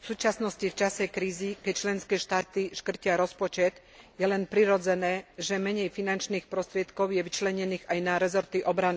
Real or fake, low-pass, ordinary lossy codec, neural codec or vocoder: real; none; none; none